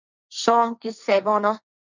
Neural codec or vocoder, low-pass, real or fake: codec, 16 kHz, 1.1 kbps, Voila-Tokenizer; 7.2 kHz; fake